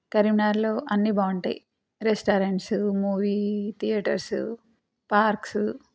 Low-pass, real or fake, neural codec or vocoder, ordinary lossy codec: none; real; none; none